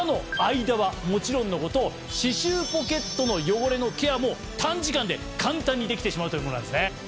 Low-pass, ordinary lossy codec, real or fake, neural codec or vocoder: none; none; real; none